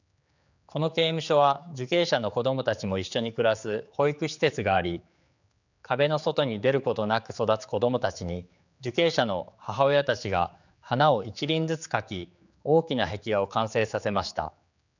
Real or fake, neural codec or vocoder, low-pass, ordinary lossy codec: fake; codec, 16 kHz, 4 kbps, X-Codec, HuBERT features, trained on general audio; 7.2 kHz; none